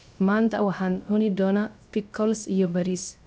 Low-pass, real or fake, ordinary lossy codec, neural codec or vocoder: none; fake; none; codec, 16 kHz, 0.3 kbps, FocalCodec